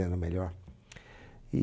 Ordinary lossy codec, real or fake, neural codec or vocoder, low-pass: none; real; none; none